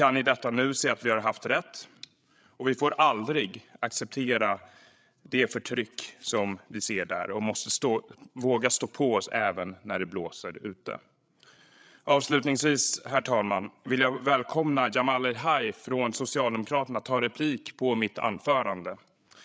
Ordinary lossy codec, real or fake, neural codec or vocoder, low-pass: none; fake; codec, 16 kHz, 8 kbps, FreqCodec, larger model; none